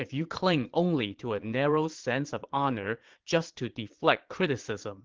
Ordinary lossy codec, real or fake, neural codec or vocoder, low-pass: Opus, 16 kbps; fake; vocoder, 22.05 kHz, 80 mel bands, Vocos; 7.2 kHz